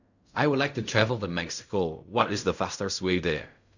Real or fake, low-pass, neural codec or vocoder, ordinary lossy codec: fake; 7.2 kHz; codec, 16 kHz in and 24 kHz out, 0.4 kbps, LongCat-Audio-Codec, fine tuned four codebook decoder; none